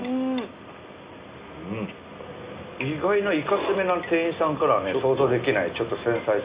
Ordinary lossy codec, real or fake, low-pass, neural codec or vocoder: Opus, 64 kbps; real; 3.6 kHz; none